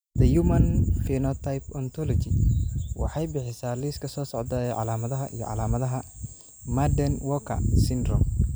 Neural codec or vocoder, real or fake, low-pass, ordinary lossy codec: none; real; none; none